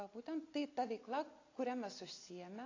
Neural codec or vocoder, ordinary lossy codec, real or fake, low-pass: none; AAC, 32 kbps; real; 7.2 kHz